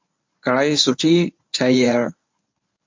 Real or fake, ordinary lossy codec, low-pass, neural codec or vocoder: fake; MP3, 64 kbps; 7.2 kHz; codec, 24 kHz, 0.9 kbps, WavTokenizer, medium speech release version 1